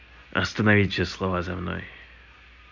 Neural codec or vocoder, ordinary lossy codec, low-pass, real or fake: none; none; 7.2 kHz; real